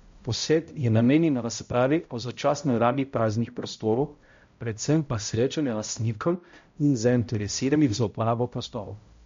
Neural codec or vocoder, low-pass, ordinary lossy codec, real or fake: codec, 16 kHz, 0.5 kbps, X-Codec, HuBERT features, trained on balanced general audio; 7.2 kHz; MP3, 48 kbps; fake